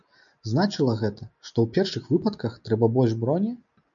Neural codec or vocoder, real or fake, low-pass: none; real; 7.2 kHz